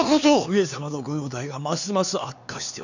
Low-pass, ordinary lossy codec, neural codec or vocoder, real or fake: 7.2 kHz; none; codec, 16 kHz, 4 kbps, X-Codec, HuBERT features, trained on LibriSpeech; fake